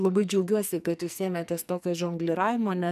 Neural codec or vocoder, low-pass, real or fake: codec, 44.1 kHz, 2.6 kbps, SNAC; 14.4 kHz; fake